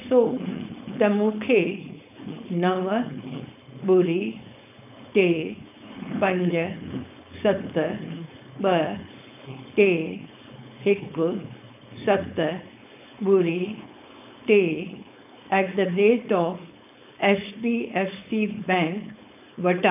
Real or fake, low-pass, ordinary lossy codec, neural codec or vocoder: fake; 3.6 kHz; none; codec, 16 kHz, 4.8 kbps, FACodec